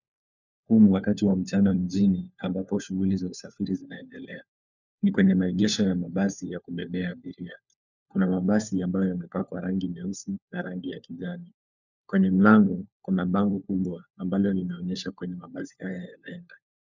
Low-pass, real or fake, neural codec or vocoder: 7.2 kHz; fake; codec, 16 kHz, 4 kbps, FunCodec, trained on LibriTTS, 50 frames a second